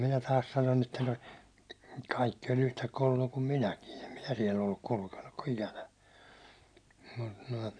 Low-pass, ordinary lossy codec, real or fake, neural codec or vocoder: 9.9 kHz; none; real; none